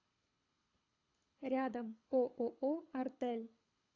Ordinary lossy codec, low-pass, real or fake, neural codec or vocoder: AAC, 48 kbps; 7.2 kHz; fake; codec, 24 kHz, 6 kbps, HILCodec